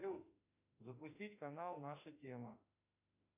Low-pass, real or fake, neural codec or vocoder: 3.6 kHz; fake; autoencoder, 48 kHz, 32 numbers a frame, DAC-VAE, trained on Japanese speech